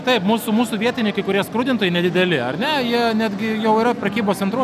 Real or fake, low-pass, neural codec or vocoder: real; 14.4 kHz; none